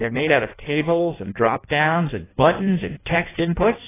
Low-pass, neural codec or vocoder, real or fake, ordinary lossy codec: 3.6 kHz; codec, 16 kHz in and 24 kHz out, 0.6 kbps, FireRedTTS-2 codec; fake; AAC, 16 kbps